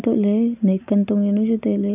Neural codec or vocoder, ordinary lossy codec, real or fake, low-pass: none; none; real; 3.6 kHz